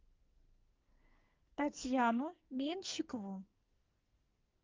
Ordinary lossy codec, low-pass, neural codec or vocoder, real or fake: Opus, 24 kbps; 7.2 kHz; codec, 44.1 kHz, 2.6 kbps, SNAC; fake